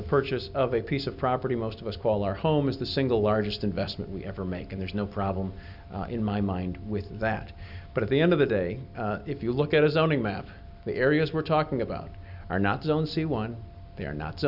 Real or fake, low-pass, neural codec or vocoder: real; 5.4 kHz; none